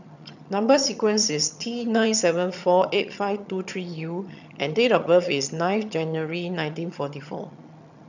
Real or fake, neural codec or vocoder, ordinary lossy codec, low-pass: fake; vocoder, 22.05 kHz, 80 mel bands, HiFi-GAN; none; 7.2 kHz